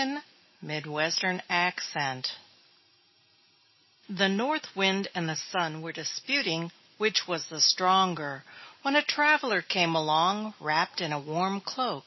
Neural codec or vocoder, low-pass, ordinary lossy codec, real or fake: none; 7.2 kHz; MP3, 24 kbps; real